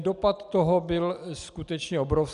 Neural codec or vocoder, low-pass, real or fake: none; 10.8 kHz; real